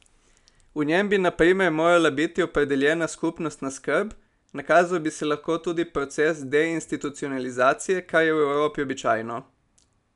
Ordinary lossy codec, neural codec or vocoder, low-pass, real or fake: none; none; 10.8 kHz; real